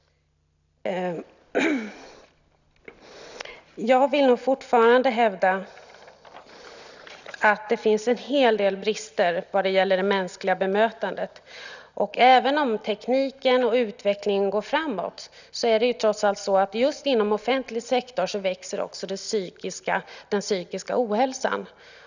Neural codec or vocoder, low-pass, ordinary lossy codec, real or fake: none; 7.2 kHz; none; real